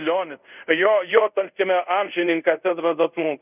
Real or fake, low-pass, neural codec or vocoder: fake; 3.6 kHz; codec, 24 kHz, 0.5 kbps, DualCodec